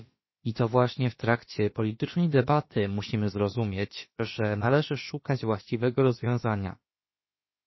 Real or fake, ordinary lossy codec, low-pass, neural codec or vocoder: fake; MP3, 24 kbps; 7.2 kHz; codec, 16 kHz, about 1 kbps, DyCAST, with the encoder's durations